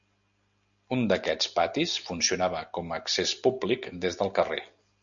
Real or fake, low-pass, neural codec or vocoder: real; 7.2 kHz; none